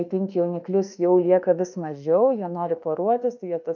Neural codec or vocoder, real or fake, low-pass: autoencoder, 48 kHz, 32 numbers a frame, DAC-VAE, trained on Japanese speech; fake; 7.2 kHz